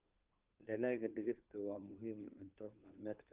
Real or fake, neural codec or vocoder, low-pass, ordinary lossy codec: fake; codec, 16 kHz, 2 kbps, FunCodec, trained on Chinese and English, 25 frames a second; 3.6 kHz; Opus, 32 kbps